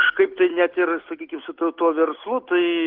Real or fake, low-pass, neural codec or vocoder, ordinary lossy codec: real; 5.4 kHz; none; Opus, 16 kbps